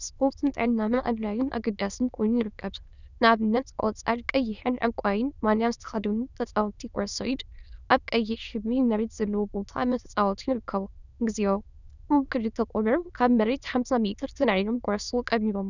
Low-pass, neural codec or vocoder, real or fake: 7.2 kHz; autoencoder, 22.05 kHz, a latent of 192 numbers a frame, VITS, trained on many speakers; fake